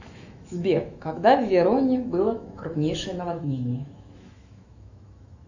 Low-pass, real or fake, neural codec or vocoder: 7.2 kHz; fake; autoencoder, 48 kHz, 128 numbers a frame, DAC-VAE, trained on Japanese speech